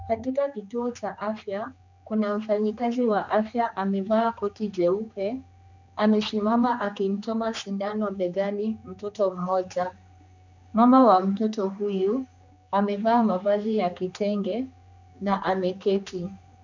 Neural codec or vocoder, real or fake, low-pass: codec, 16 kHz, 2 kbps, X-Codec, HuBERT features, trained on general audio; fake; 7.2 kHz